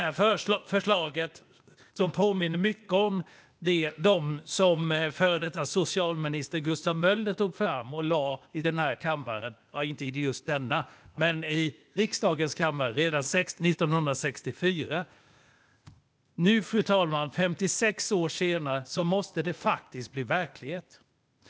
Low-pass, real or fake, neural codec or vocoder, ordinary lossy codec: none; fake; codec, 16 kHz, 0.8 kbps, ZipCodec; none